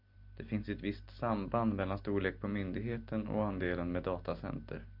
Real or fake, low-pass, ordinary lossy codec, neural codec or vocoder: real; 5.4 kHz; MP3, 48 kbps; none